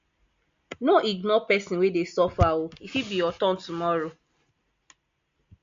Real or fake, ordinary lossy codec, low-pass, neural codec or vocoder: real; MP3, 48 kbps; 7.2 kHz; none